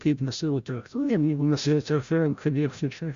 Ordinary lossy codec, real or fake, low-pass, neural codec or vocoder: Opus, 64 kbps; fake; 7.2 kHz; codec, 16 kHz, 0.5 kbps, FreqCodec, larger model